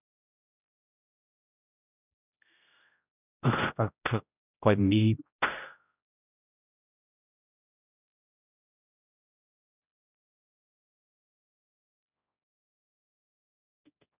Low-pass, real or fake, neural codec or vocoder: 3.6 kHz; fake; codec, 16 kHz, 0.5 kbps, X-Codec, HuBERT features, trained on general audio